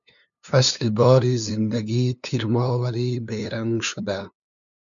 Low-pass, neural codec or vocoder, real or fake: 7.2 kHz; codec, 16 kHz, 2 kbps, FunCodec, trained on LibriTTS, 25 frames a second; fake